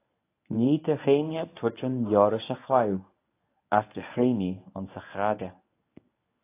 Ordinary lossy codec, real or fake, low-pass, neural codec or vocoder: AAC, 24 kbps; fake; 3.6 kHz; codec, 24 kHz, 0.9 kbps, WavTokenizer, medium speech release version 1